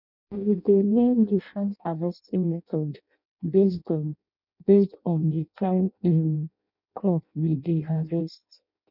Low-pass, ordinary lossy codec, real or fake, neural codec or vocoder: 5.4 kHz; none; fake; codec, 16 kHz in and 24 kHz out, 0.6 kbps, FireRedTTS-2 codec